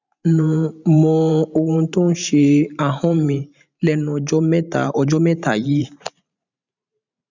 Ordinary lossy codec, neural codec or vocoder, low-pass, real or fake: none; vocoder, 44.1 kHz, 128 mel bands every 512 samples, BigVGAN v2; 7.2 kHz; fake